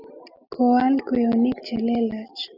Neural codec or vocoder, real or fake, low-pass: none; real; 5.4 kHz